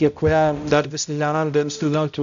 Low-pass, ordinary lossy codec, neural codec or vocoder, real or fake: 7.2 kHz; MP3, 96 kbps; codec, 16 kHz, 0.5 kbps, X-Codec, HuBERT features, trained on balanced general audio; fake